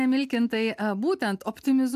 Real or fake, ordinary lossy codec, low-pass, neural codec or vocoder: real; AAC, 96 kbps; 14.4 kHz; none